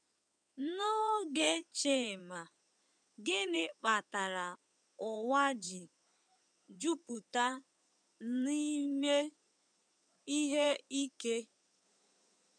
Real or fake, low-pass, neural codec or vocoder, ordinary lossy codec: fake; 9.9 kHz; codec, 16 kHz in and 24 kHz out, 2.2 kbps, FireRedTTS-2 codec; none